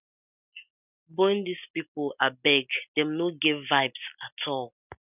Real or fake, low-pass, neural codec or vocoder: real; 3.6 kHz; none